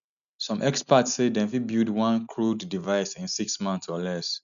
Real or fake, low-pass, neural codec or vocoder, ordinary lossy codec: real; 7.2 kHz; none; none